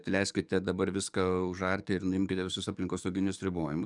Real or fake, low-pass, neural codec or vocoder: fake; 10.8 kHz; codec, 44.1 kHz, 7.8 kbps, DAC